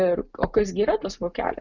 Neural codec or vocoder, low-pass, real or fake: none; 7.2 kHz; real